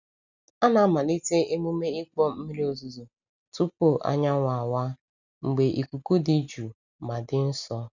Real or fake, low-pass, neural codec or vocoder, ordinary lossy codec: real; 7.2 kHz; none; none